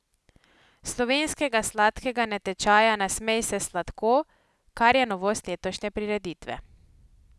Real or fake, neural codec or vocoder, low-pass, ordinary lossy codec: real; none; none; none